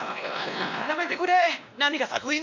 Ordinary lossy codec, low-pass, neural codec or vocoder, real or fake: none; 7.2 kHz; codec, 16 kHz, 1 kbps, X-Codec, WavLM features, trained on Multilingual LibriSpeech; fake